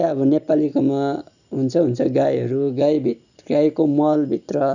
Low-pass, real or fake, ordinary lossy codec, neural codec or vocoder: 7.2 kHz; real; none; none